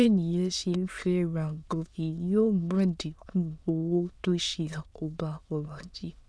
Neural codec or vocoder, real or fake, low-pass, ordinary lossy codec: autoencoder, 22.05 kHz, a latent of 192 numbers a frame, VITS, trained on many speakers; fake; none; none